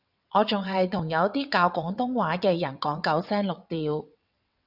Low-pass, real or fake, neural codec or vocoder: 5.4 kHz; fake; vocoder, 22.05 kHz, 80 mel bands, WaveNeXt